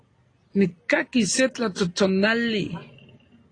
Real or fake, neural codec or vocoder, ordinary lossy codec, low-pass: real; none; AAC, 32 kbps; 9.9 kHz